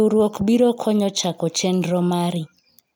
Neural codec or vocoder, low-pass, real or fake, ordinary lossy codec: none; none; real; none